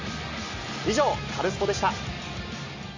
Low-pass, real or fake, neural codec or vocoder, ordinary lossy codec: 7.2 kHz; real; none; MP3, 32 kbps